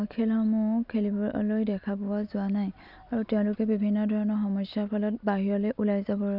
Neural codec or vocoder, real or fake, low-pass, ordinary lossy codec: none; real; 5.4 kHz; none